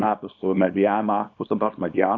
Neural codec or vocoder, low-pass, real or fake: codec, 24 kHz, 0.9 kbps, WavTokenizer, small release; 7.2 kHz; fake